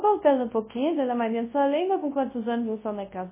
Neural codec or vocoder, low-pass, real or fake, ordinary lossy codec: codec, 24 kHz, 0.9 kbps, WavTokenizer, large speech release; 3.6 kHz; fake; MP3, 16 kbps